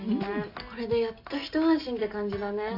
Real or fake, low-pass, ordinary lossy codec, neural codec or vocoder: real; 5.4 kHz; AAC, 48 kbps; none